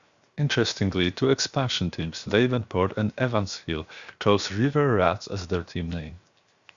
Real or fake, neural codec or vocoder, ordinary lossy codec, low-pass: fake; codec, 16 kHz, 0.7 kbps, FocalCodec; Opus, 64 kbps; 7.2 kHz